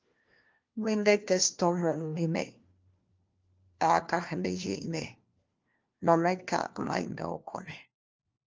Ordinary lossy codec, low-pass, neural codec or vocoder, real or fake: Opus, 24 kbps; 7.2 kHz; codec, 16 kHz, 1 kbps, FunCodec, trained on LibriTTS, 50 frames a second; fake